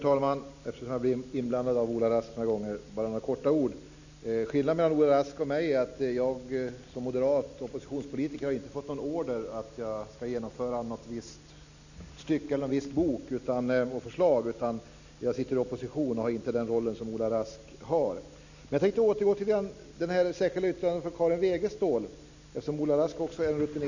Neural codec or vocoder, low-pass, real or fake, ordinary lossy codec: none; 7.2 kHz; real; none